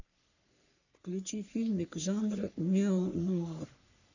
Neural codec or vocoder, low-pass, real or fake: codec, 44.1 kHz, 3.4 kbps, Pupu-Codec; 7.2 kHz; fake